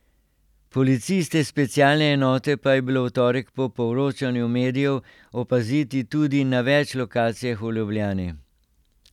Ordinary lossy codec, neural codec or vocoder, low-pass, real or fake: none; none; 19.8 kHz; real